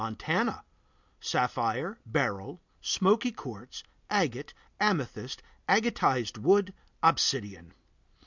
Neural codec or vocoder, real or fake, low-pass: none; real; 7.2 kHz